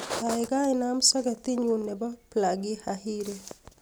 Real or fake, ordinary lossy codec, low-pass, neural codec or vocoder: real; none; none; none